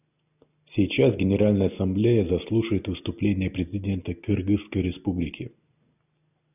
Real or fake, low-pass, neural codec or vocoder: real; 3.6 kHz; none